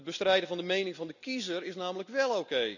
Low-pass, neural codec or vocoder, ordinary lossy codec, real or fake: 7.2 kHz; none; none; real